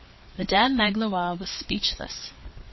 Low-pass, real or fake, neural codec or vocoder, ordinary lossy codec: 7.2 kHz; fake; codec, 16 kHz, 4 kbps, FreqCodec, larger model; MP3, 24 kbps